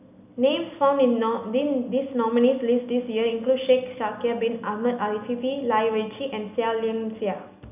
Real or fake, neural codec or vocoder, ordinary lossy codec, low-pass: real; none; none; 3.6 kHz